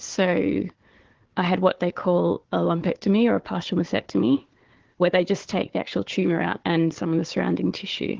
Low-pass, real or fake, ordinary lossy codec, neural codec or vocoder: 7.2 kHz; fake; Opus, 16 kbps; codec, 16 kHz, 4 kbps, FunCodec, trained on Chinese and English, 50 frames a second